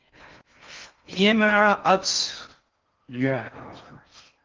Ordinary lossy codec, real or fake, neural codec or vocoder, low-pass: Opus, 24 kbps; fake; codec, 16 kHz in and 24 kHz out, 0.6 kbps, FocalCodec, streaming, 4096 codes; 7.2 kHz